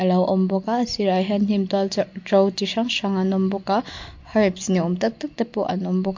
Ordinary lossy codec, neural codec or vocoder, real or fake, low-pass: MP3, 48 kbps; none; real; 7.2 kHz